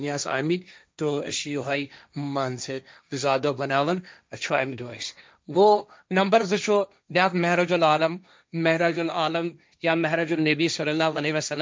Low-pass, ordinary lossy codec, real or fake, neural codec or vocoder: none; none; fake; codec, 16 kHz, 1.1 kbps, Voila-Tokenizer